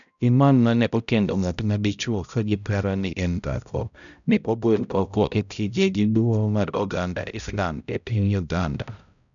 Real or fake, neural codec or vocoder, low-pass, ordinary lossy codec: fake; codec, 16 kHz, 0.5 kbps, X-Codec, HuBERT features, trained on balanced general audio; 7.2 kHz; none